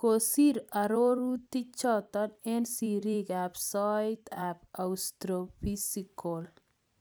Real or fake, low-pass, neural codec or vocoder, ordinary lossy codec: fake; none; vocoder, 44.1 kHz, 128 mel bands every 256 samples, BigVGAN v2; none